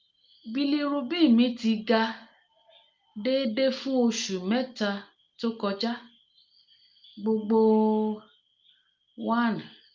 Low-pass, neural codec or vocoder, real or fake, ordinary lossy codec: 7.2 kHz; none; real; Opus, 24 kbps